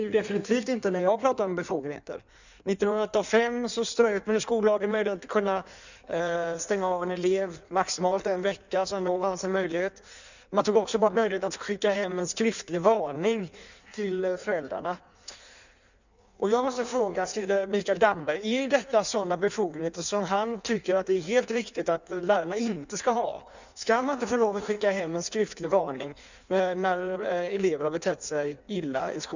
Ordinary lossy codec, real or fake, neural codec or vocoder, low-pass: none; fake; codec, 16 kHz in and 24 kHz out, 1.1 kbps, FireRedTTS-2 codec; 7.2 kHz